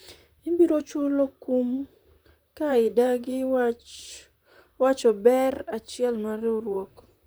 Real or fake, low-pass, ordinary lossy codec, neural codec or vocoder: fake; none; none; vocoder, 44.1 kHz, 128 mel bands, Pupu-Vocoder